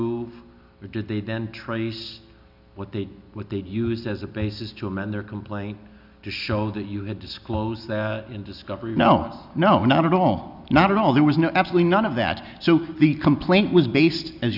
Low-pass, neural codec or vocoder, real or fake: 5.4 kHz; none; real